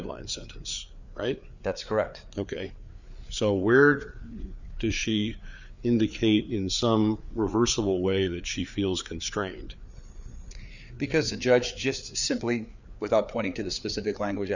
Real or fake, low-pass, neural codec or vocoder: fake; 7.2 kHz; codec, 16 kHz, 4 kbps, FreqCodec, larger model